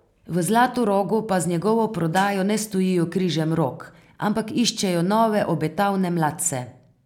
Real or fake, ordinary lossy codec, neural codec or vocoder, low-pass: real; none; none; 19.8 kHz